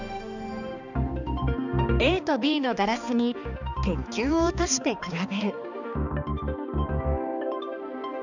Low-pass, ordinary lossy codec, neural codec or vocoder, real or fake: 7.2 kHz; none; codec, 16 kHz, 2 kbps, X-Codec, HuBERT features, trained on balanced general audio; fake